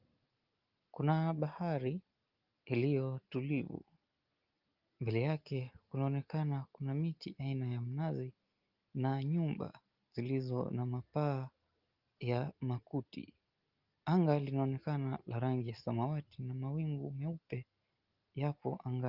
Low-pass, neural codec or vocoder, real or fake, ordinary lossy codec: 5.4 kHz; none; real; Opus, 32 kbps